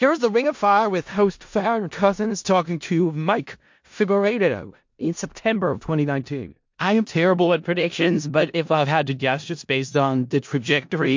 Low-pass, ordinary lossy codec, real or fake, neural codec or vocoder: 7.2 kHz; MP3, 48 kbps; fake; codec, 16 kHz in and 24 kHz out, 0.4 kbps, LongCat-Audio-Codec, four codebook decoder